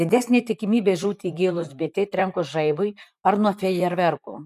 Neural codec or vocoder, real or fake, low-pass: vocoder, 44.1 kHz, 128 mel bands, Pupu-Vocoder; fake; 14.4 kHz